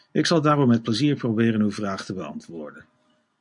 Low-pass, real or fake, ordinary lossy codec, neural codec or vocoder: 10.8 kHz; real; AAC, 64 kbps; none